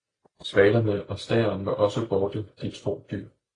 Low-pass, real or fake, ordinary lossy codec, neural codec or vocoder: 9.9 kHz; real; AAC, 32 kbps; none